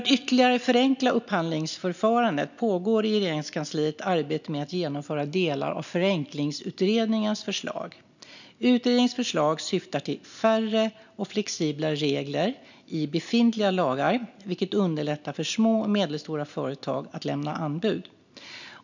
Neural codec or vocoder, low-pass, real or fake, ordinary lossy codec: none; 7.2 kHz; real; none